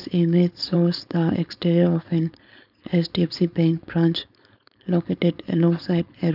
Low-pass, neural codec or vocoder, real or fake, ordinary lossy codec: 5.4 kHz; codec, 16 kHz, 4.8 kbps, FACodec; fake; none